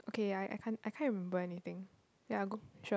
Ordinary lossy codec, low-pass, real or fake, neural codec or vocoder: none; none; real; none